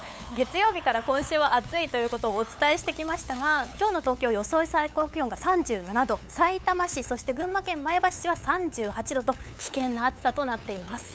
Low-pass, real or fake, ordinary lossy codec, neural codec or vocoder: none; fake; none; codec, 16 kHz, 8 kbps, FunCodec, trained on LibriTTS, 25 frames a second